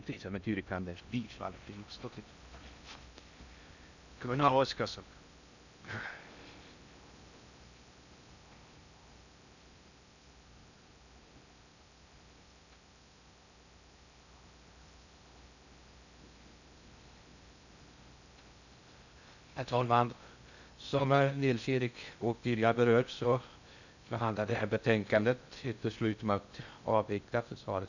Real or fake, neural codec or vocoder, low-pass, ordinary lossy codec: fake; codec, 16 kHz in and 24 kHz out, 0.6 kbps, FocalCodec, streaming, 2048 codes; 7.2 kHz; none